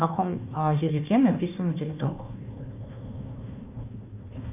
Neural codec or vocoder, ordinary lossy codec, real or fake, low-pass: codec, 16 kHz, 1 kbps, FunCodec, trained on Chinese and English, 50 frames a second; AAC, 32 kbps; fake; 3.6 kHz